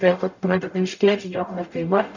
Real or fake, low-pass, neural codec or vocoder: fake; 7.2 kHz; codec, 44.1 kHz, 0.9 kbps, DAC